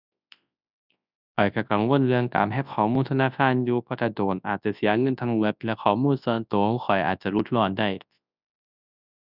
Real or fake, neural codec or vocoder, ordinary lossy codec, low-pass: fake; codec, 24 kHz, 0.9 kbps, WavTokenizer, large speech release; none; 5.4 kHz